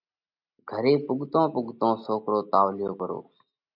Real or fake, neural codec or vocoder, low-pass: real; none; 5.4 kHz